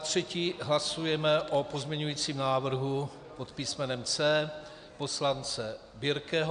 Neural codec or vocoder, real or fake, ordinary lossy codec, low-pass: none; real; AAC, 96 kbps; 9.9 kHz